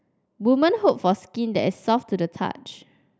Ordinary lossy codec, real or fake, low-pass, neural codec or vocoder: none; real; none; none